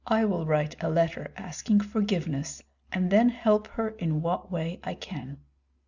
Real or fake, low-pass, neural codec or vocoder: real; 7.2 kHz; none